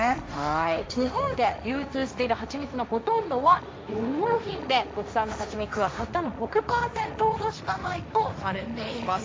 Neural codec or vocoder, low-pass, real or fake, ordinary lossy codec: codec, 16 kHz, 1.1 kbps, Voila-Tokenizer; none; fake; none